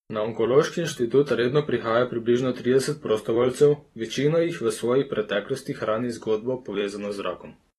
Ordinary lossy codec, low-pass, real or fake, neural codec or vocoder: AAC, 32 kbps; 19.8 kHz; fake; vocoder, 44.1 kHz, 128 mel bands every 256 samples, BigVGAN v2